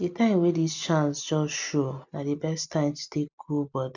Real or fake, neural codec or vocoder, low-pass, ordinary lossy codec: real; none; 7.2 kHz; none